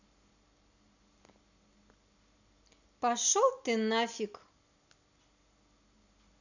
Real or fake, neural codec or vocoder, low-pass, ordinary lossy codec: real; none; 7.2 kHz; none